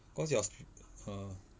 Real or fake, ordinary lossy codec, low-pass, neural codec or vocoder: real; none; none; none